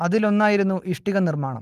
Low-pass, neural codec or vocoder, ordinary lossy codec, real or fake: 14.4 kHz; none; Opus, 24 kbps; real